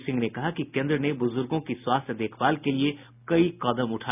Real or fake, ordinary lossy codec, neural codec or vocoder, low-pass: real; none; none; 3.6 kHz